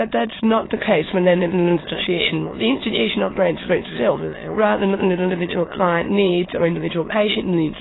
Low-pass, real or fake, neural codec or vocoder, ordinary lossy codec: 7.2 kHz; fake; autoencoder, 22.05 kHz, a latent of 192 numbers a frame, VITS, trained on many speakers; AAC, 16 kbps